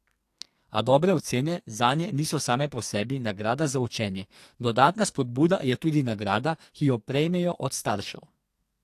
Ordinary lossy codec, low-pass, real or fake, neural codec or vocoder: AAC, 64 kbps; 14.4 kHz; fake; codec, 44.1 kHz, 2.6 kbps, SNAC